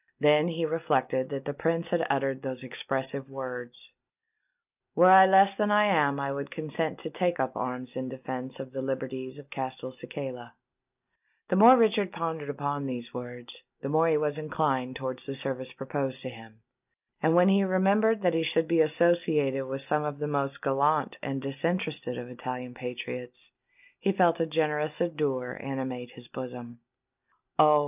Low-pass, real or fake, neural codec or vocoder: 3.6 kHz; real; none